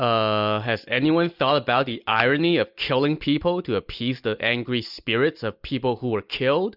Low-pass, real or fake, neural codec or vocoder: 5.4 kHz; real; none